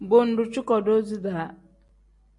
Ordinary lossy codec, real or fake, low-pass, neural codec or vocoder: MP3, 48 kbps; real; 10.8 kHz; none